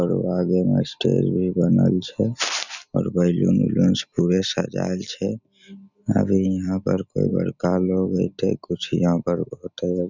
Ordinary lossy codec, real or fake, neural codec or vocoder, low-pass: none; real; none; 7.2 kHz